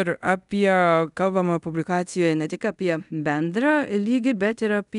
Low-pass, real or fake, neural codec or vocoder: 10.8 kHz; fake; codec, 24 kHz, 0.5 kbps, DualCodec